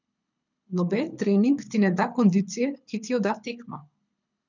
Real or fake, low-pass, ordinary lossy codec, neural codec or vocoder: fake; 7.2 kHz; none; codec, 24 kHz, 6 kbps, HILCodec